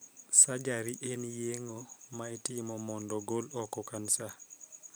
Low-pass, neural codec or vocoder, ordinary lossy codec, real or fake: none; vocoder, 44.1 kHz, 128 mel bands every 512 samples, BigVGAN v2; none; fake